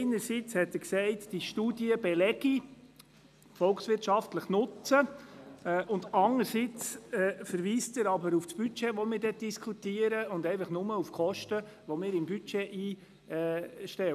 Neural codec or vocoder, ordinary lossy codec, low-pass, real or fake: none; none; 14.4 kHz; real